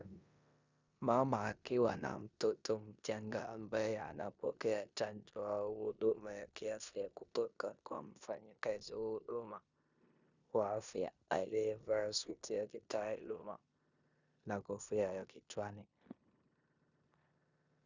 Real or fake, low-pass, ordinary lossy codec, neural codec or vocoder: fake; 7.2 kHz; Opus, 64 kbps; codec, 16 kHz in and 24 kHz out, 0.9 kbps, LongCat-Audio-Codec, four codebook decoder